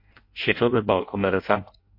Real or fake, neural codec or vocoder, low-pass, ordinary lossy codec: fake; codec, 16 kHz in and 24 kHz out, 0.6 kbps, FireRedTTS-2 codec; 5.4 kHz; MP3, 32 kbps